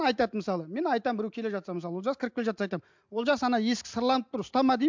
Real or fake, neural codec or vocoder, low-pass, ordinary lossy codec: real; none; 7.2 kHz; MP3, 64 kbps